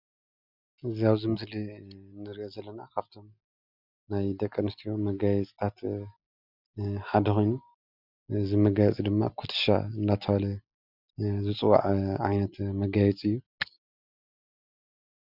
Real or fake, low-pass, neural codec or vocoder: real; 5.4 kHz; none